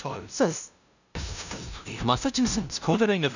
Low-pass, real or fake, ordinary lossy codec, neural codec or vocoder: 7.2 kHz; fake; none; codec, 16 kHz, 0.5 kbps, FunCodec, trained on LibriTTS, 25 frames a second